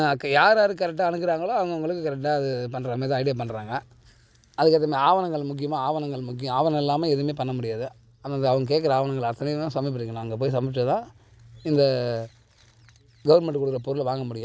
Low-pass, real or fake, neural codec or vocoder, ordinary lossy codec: none; real; none; none